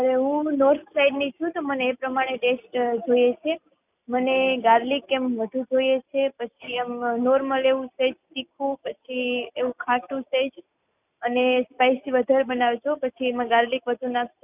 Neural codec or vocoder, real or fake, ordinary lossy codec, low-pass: none; real; none; 3.6 kHz